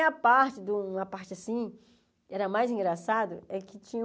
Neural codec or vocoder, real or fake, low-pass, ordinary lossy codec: none; real; none; none